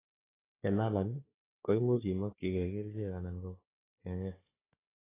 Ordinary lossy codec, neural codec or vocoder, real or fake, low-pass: AAC, 16 kbps; codec, 16 kHz, 4 kbps, FunCodec, trained on Chinese and English, 50 frames a second; fake; 3.6 kHz